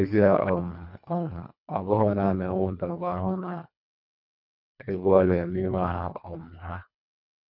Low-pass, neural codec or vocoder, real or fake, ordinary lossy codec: 5.4 kHz; codec, 24 kHz, 1.5 kbps, HILCodec; fake; none